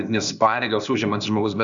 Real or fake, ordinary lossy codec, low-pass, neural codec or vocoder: fake; MP3, 96 kbps; 7.2 kHz; codec, 16 kHz, 0.8 kbps, ZipCodec